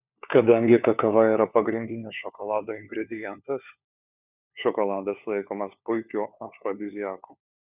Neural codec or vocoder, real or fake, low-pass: codec, 16 kHz, 4 kbps, FunCodec, trained on LibriTTS, 50 frames a second; fake; 3.6 kHz